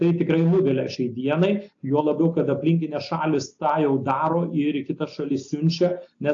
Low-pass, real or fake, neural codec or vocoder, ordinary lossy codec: 7.2 kHz; real; none; AAC, 48 kbps